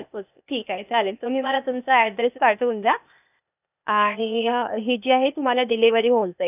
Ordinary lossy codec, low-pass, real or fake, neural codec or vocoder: none; 3.6 kHz; fake; codec, 16 kHz, 0.8 kbps, ZipCodec